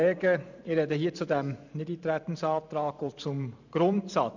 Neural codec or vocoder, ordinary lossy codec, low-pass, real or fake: vocoder, 44.1 kHz, 128 mel bands every 512 samples, BigVGAN v2; none; 7.2 kHz; fake